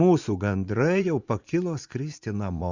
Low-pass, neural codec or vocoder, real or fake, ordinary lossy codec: 7.2 kHz; vocoder, 44.1 kHz, 80 mel bands, Vocos; fake; Opus, 64 kbps